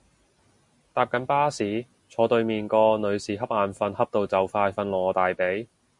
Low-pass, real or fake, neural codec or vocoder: 10.8 kHz; real; none